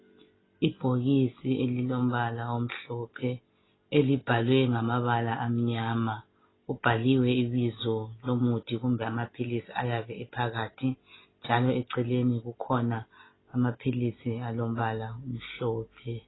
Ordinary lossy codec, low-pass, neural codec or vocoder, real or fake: AAC, 16 kbps; 7.2 kHz; none; real